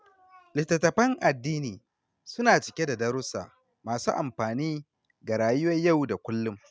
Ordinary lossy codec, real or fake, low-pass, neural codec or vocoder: none; real; none; none